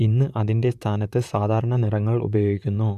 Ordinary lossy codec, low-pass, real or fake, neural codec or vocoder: none; 14.4 kHz; fake; vocoder, 44.1 kHz, 128 mel bands, Pupu-Vocoder